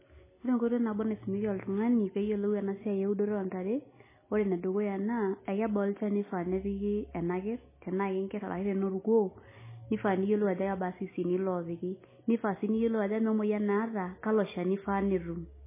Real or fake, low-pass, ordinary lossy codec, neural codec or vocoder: real; 3.6 kHz; MP3, 16 kbps; none